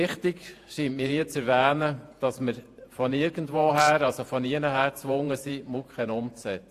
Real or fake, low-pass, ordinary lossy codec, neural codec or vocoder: fake; 14.4 kHz; AAC, 64 kbps; vocoder, 48 kHz, 128 mel bands, Vocos